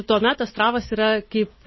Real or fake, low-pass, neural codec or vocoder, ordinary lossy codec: real; 7.2 kHz; none; MP3, 24 kbps